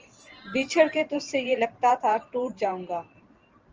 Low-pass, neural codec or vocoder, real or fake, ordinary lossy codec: 7.2 kHz; vocoder, 44.1 kHz, 128 mel bands every 512 samples, BigVGAN v2; fake; Opus, 24 kbps